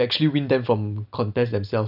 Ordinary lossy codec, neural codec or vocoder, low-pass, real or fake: none; none; 5.4 kHz; real